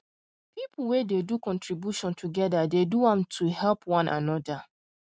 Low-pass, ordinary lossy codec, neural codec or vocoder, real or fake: none; none; none; real